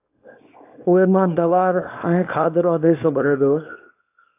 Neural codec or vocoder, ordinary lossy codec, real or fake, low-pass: codec, 24 kHz, 0.9 kbps, WavTokenizer, small release; AAC, 24 kbps; fake; 3.6 kHz